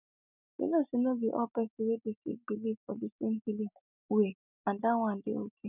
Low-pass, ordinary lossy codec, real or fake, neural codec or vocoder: 3.6 kHz; none; real; none